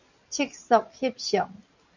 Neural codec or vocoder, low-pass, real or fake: none; 7.2 kHz; real